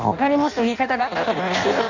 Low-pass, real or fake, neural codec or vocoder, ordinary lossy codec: 7.2 kHz; fake; codec, 16 kHz in and 24 kHz out, 0.6 kbps, FireRedTTS-2 codec; none